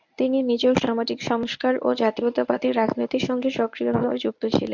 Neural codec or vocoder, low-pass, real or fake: codec, 24 kHz, 0.9 kbps, WavTokenizer, medium speech release version 1; 7.2 kHz; fake